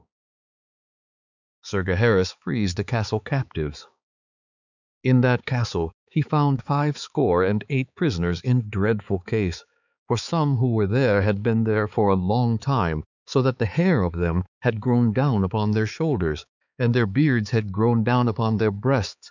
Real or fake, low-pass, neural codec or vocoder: fake; 7.2 kHz; codec, 16 kHz, 4 kbps, X-Codec, HuBERT features, trained on balanced general audio